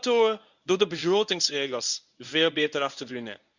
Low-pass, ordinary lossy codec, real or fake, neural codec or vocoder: 7.2 kHz; none; fake; codec, 24 kHz, 0.9 kbps, WavTokenizer, medium speech release version 1